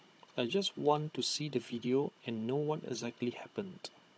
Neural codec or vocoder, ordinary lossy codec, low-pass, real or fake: codec, 16 kHz, 16 kbps, FunCodec, trained on Chinese and English, 50 frames a second; none; none; fake